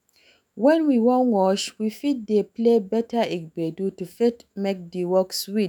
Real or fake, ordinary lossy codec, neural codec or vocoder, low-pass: fake; none; autoencoder, 48 kHz, 128 numbers a frame, DAC-VAE, trained on Japanese speech; none